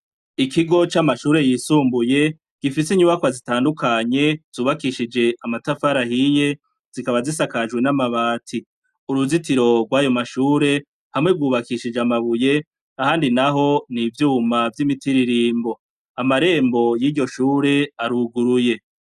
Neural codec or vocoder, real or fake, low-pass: none; real; 14.4 kHz